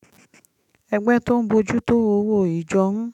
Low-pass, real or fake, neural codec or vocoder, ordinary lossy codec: 19.8 kHz; fake; autoencoder, 48 kHz, 128 numbers a frame, DAC-VAE, trained on Japanese speech; none